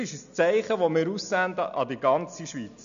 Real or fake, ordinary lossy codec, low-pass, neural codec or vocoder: real; none; 7.2 kHz; none